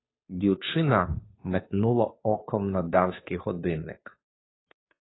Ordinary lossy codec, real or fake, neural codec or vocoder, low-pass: AAC, 16 kbps; fake; codec, 16 kHz, 2 kbps, FunCodec, trained on Chinese and English, 25 frames a second; 7.2 kHz